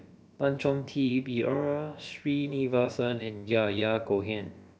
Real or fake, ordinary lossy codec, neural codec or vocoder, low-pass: fake; none; codec, 16 kHz, about 1 kbps, DyCAST, with the encoder's durations; none